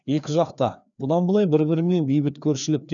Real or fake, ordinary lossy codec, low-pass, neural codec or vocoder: fake; none; 7.2 kHz; codec, 16 kHz, 2 kbps, FreqCodec, larger model